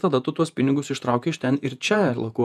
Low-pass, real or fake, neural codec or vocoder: 14.4 kHz; fake; vocoder, 48 kHz, 128 mel bands, Vocos